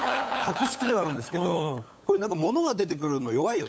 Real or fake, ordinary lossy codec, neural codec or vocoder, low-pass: fake; none; codec, 16 kHz, 8 kbps, FunCodec, trained on LibriTTS, 25 frames a second; none